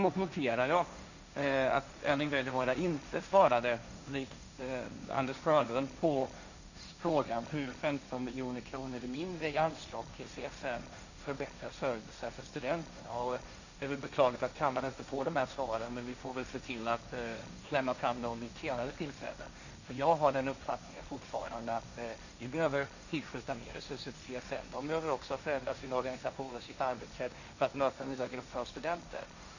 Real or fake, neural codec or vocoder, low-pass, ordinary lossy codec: fake; codec, 16 kHz, 1.1 kbps, Voila-Tokenizer; 7.2 kHz; none